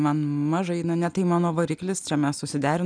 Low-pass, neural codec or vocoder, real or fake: 9.9 kHz; none; real